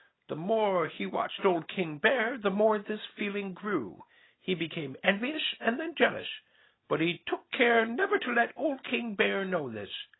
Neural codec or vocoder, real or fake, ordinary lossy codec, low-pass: none; real; AAC, 16 kbps; 7.2 kHz